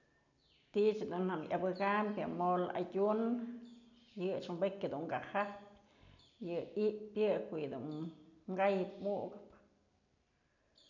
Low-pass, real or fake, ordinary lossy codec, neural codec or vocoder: 7.2 kHz; real; none; none